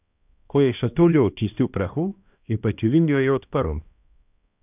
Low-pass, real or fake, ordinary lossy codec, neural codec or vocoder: 3.6 kHz; fake; none; codec, 16 kHz, 1 kbps, X-Codec, HuBERT features, trained on balanced general audio